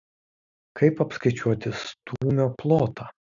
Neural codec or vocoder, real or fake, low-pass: none; real; 7.2 kHz